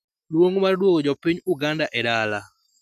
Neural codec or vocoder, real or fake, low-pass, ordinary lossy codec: none; real; 14.4 kHz; none